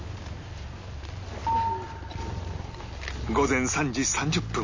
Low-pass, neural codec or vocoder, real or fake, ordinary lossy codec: 7.2 kHz; none; real; MP3, 32 kbps